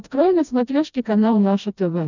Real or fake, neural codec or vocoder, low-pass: fake; codec, 16 kHz, 1 kbps, FreqCodec, smaller model; 7.2 kHz